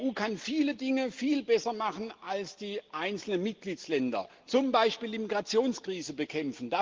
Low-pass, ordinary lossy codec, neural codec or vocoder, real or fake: 7.2 kHz; Opus, 16 kbps; none; real